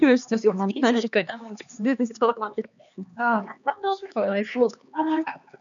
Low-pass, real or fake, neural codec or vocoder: 7.2 kHz; fake; codec, 16 kHz, 4 kbps, X-Codec, HuBERT features, trained on LibriSpeech